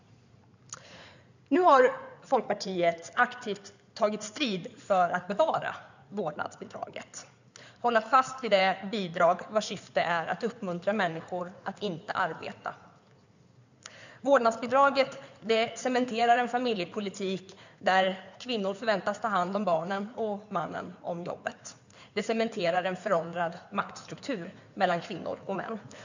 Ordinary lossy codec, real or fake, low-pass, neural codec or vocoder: none; fake; 7.2 kHz; codec, 16 kHz in and 24 kHz out, 2.2 kbps, FireRedTTS-2 codec